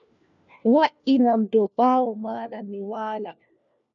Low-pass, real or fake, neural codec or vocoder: 7.2 kHz; fake; codec, 16 kHz, 1 kbps, FunCodec, trained on LibriTTS, 50 frames a second